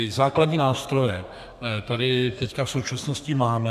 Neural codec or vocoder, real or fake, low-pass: codec, 32 kHz, 1.9 kbps, SNAC; fake; 14.4 kHz